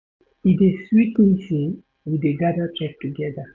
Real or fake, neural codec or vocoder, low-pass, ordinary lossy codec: real; none; 7.2 kHz; none